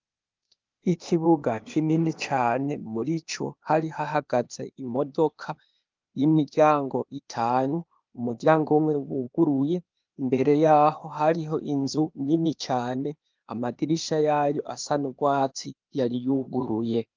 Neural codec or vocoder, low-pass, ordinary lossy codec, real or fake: codec, 16 kHz, 0.8 kbps, ZipCodec; 7.2 kHz; Opus, 24 kbps; fake